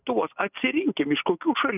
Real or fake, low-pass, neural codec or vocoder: real; 3.6 kHz; none